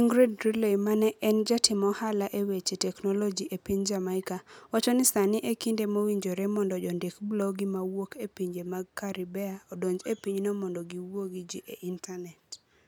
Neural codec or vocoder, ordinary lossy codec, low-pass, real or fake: none; none; none; real